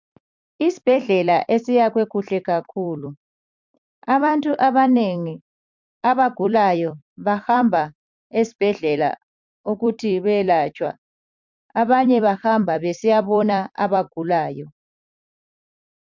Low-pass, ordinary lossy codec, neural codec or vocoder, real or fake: 7.2 kHz; MP3, 64 kbps; vocoder, 44.1 kHz, 128 mel bands every 256 samples, BigVGAN v2; fake